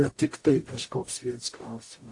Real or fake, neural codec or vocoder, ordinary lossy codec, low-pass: fake; codec, 44.1 kHz, 0.9 kbps, DAC; AAC, 48 kbps; 10.8 kHz